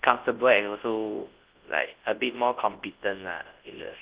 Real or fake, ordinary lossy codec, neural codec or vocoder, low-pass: fake; Opus, 16 kbps; codec, 24 kHz, 0.9 kbps, WavTokenizer, large speech release; 3.6 kHz